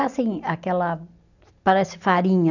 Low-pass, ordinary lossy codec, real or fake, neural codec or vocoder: 7.2 kHz; none; real; none